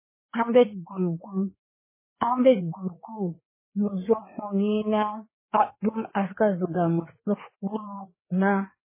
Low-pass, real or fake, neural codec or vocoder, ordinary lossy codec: 3.6 kHz; fake; codec, 16 kHz, 4 kbps, FreqCodec, larger model; MP3, 16 kbps